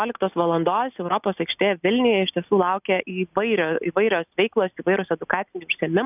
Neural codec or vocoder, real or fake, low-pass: none; real; 3.6 kHz